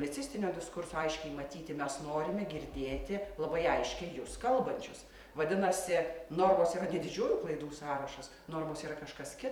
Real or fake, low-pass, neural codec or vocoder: fake; 19.8 kHz; vocoder, 44.1 kHz, 128 mel bands every 256 samples, BigVGAN v2